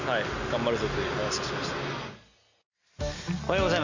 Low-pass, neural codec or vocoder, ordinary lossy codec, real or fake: 7.2 kHz; none; Opus, 64 kbps; real